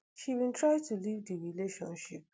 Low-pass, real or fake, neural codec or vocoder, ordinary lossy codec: none; real; none; none